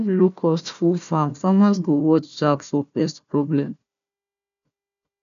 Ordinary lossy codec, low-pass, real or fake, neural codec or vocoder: none; 7.2 kHz; fake; codec, 16 kHz, 1 kbps, FunCodec, trained on Chinese and English, 50 frames a second